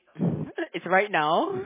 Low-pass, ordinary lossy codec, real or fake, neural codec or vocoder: 3.6 kHz; MP3, 16 kbps; real; none